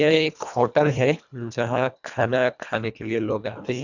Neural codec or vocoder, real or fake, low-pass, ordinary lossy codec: codec, 24 kHz, 1.5 kbps, HILCodec; fake; 7.2 kHz; none